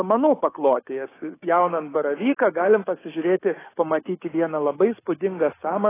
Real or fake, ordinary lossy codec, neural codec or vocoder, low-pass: fake; AAC, 16 kbps; codec, 16 kHz, 6 kbps, DAC; 3.6 kHz